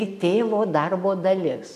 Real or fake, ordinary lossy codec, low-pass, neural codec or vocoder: real; MP3, 96 kbps; 14.4 kHz; none